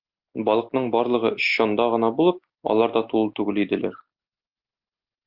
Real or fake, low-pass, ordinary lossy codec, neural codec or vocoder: real; 5.4 kHz; Opus, 24 kbps; none